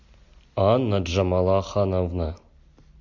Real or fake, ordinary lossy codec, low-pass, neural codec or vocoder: real; MP3, 48 kbps; 7.2 kHz; none